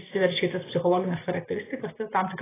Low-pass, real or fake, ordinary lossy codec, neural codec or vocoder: 3.6 kHz; real; AAC, 16 kbps; none